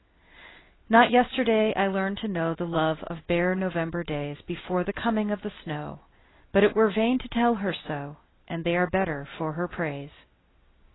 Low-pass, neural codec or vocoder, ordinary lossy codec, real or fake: 7.2 kHz; none; AAC, 16 kbps; real